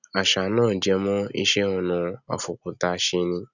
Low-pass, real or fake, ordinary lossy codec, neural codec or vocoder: 7.2 kHz; real; none; none